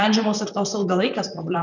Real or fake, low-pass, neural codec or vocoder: fake; 7.2 kHz; vocoder, 44.1 kHz, 128 mel bands, Pupu-Vocoder